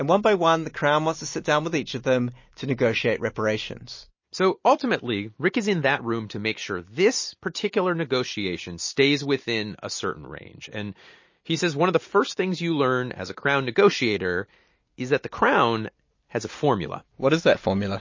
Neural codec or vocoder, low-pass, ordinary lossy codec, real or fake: none; 7.2 kHz; MP3, 32 kbps; real